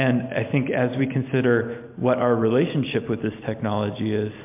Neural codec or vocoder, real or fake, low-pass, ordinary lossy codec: none; real; 3.6 kHz; MP3, 32 kbps